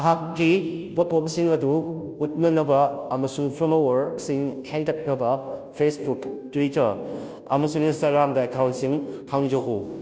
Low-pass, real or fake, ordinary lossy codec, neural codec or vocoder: none; fake; none; codec, 16 kHz, 0.5 kbps, FunCodec, trained on Chinese and English, 25 frames a second